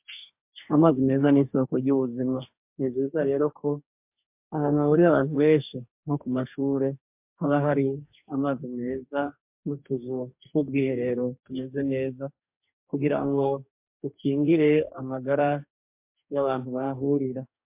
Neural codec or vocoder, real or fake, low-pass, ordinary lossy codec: codec, 44.1 kHz, 2.6 kbps, DAC; fake; 3.6 kHz; MP3, 32 kbps